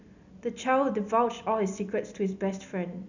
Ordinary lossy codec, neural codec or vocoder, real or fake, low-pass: none; none; real; 7.2 kHz